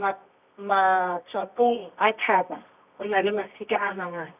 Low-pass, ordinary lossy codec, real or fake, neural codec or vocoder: 3.6 kHz; none; fake; codec, 24 kHz, 0.9 kbps, WavTokenizer, medium music audio release